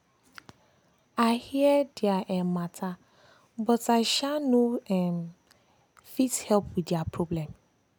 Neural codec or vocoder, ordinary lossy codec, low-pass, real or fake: none; none; none; real